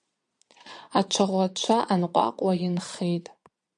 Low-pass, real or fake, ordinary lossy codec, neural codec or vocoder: 9.9 kHz; fake; MP3, 96 kbps; vocoder, 22.05 kHz, 80 mel bands, Vocos